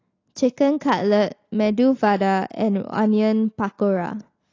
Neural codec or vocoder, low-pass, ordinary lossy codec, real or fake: none; 7.2 kHz; AAC, 32 kbps; real